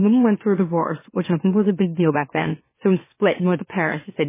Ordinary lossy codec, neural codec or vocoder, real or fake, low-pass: MP3, 16 kbps; autoencoder, 44.1 kHz, a latent of 192 numbers a frame, MeloTTS; fake; 3.6 kHz